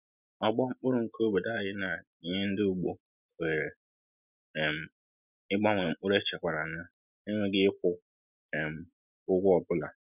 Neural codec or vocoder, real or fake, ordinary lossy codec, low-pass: none; real; none; 3.6 kHz